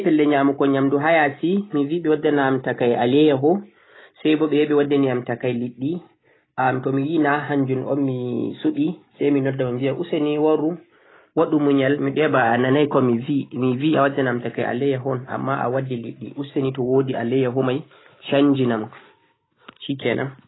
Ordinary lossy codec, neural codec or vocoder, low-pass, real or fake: AAC, 16 kbps; none; 7.2 kHz; real